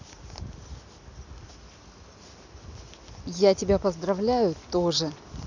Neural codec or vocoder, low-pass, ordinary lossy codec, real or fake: none; 7.2 kHz; none; real